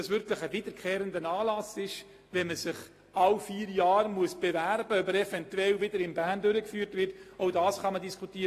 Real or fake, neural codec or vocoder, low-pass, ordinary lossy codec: real; none; 14.4 kHz; AAC, 48 kbps